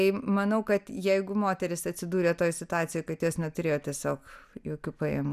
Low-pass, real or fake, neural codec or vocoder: 14.4 kHz; real; none